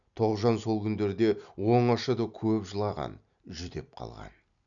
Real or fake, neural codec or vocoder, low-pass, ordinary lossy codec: real; none; 7.2 kHz; none